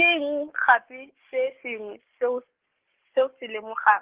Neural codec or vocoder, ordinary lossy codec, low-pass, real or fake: codec, 44.1 kHz, 7.8 kbps, DAC; Opus, 16 kbps; 3.6 kHz; fake